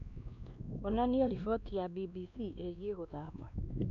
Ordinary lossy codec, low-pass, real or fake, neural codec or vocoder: none; 7.2 kHz; fake; codec, 16 kHz, 2 kbps, X-Codec, WavLM features, trained on Multilingual LibriSpeech